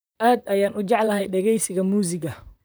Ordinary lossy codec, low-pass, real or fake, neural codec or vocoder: none; none; fake; vocoder, 44.1 kHz, 128 mel bands, Pupu-Vocoder